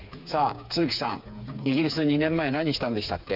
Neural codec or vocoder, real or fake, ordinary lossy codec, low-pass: codec, 16 kHz, 4 kbps, FreqCodec, smaller model; fake; none; 5.4 kHz